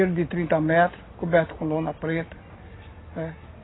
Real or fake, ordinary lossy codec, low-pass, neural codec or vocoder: real; AAC, 16 kbps; 7.2 kHz; none